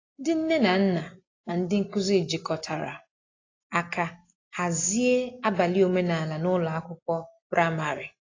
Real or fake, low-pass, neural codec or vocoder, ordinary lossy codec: real; 7.2 kHz; none; AAC, 32 kbps